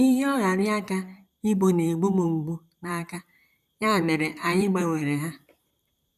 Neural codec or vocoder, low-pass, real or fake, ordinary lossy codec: vocoder, 44.1 kHz, 128 mel bands, Pupu-Vocoder; 14.4 kHz; fake; none